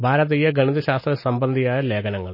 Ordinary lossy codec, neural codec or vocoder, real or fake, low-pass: MP3, 24 kbps; none; real; 5.4 kHz